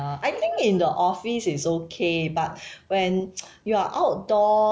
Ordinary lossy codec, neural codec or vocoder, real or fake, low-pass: none; none; real; none